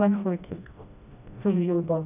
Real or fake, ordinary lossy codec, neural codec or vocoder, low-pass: fake; none; codec, 16 kHz, 1 kbps, FreqCodec, smaller model; 3.6 kHz